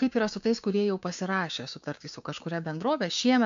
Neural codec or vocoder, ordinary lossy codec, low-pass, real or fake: codec, 16 kHz, 6 kbps, DAC; MP3, 64 kbps; 7.2 kHz; fake